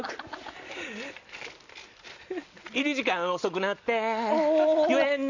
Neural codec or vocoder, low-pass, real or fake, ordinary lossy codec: none; 7.2 kHz; real; none